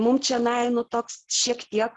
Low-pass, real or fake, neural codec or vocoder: 10.8 kHz; real; none